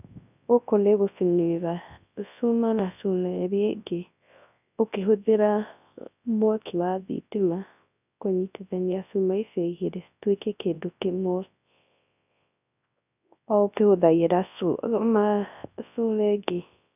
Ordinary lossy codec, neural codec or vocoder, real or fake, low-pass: none; codec, 24 kHz, 0.9 kbps, WavTokenizer, large speech release; fake; 3.6 kHz